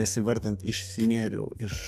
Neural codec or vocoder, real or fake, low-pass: codec, 32 kHz, 1.9 kbps, SNAC; fake; 14.4 kHz